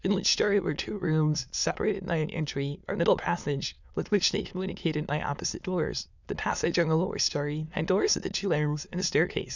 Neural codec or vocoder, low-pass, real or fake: autoencoder, 22.05 kHz, a latent of 192 numbers a frame, VITS, trained on many speakers; 7.2 kHz; fake